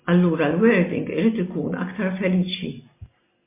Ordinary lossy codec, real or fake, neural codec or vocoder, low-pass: MP3, 24 kbps; real; none; 3.6 kHz